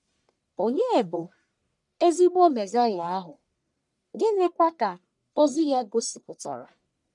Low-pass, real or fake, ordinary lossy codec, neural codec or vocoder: 10.8 kHz; fake; none; codec, 44.1 kHz, 1.7 kbps, Pupu-Codec